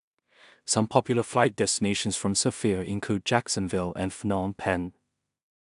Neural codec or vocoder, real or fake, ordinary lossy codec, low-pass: codec, 16 kHz in and 24 kHz out, 0.4 kbps, LongCat-Audio-Codec, two codebook decoder; fake; none; 10.8 kHz